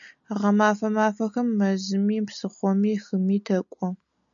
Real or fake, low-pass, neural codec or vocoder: real; 7.2 kHz; none